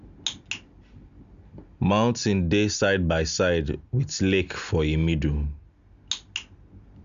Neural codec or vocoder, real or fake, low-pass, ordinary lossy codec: none; real; 7.2 kHz; Opus, 64 kbps